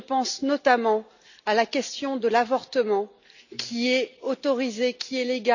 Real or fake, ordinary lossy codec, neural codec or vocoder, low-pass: real; none; none; 7.2 kHz